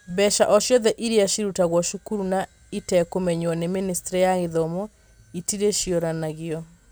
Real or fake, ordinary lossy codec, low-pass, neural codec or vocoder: real; none; none; none